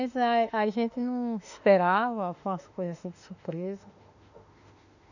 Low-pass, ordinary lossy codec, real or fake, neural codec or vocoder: 7.2 kHz; none; fake; autoencoder, 48 kHz, 32 numbers a frame, DAC-VAE, trained on Japanese speech